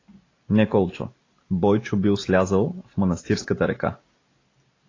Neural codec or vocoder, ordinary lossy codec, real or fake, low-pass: none; AAC, 32 kbps; real; 7.2 kHz